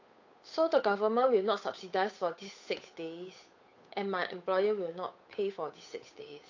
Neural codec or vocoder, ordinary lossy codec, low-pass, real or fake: codec, 16 kHz, 8 kbps, FunCodec, trained on Chinese and English, 25 frames a second; AAC, 48 kbps; 7.2 kHz; fake